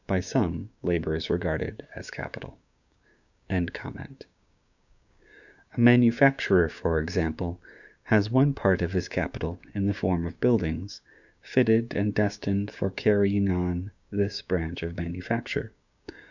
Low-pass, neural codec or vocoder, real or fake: 7.2 kHz; codec, 16 kHz, 6 kbps, DAC; fake